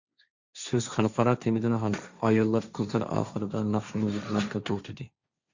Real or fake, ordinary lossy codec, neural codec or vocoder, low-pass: fake; Opus, 64 kbps; codec, 16 kHz, 1.1 kbps, Voila-Tokenizer; 7.2 kHz